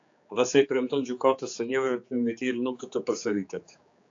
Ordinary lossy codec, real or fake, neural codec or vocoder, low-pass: AAC, 48 kbps; fake; codec, 16 kHz, 4 kbps, X-Codec, HuBERT features, trained on general audio; 7.2 kHz